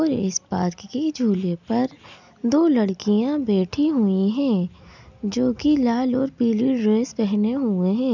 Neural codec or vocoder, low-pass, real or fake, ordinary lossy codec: none; 7.2 kHz; real; none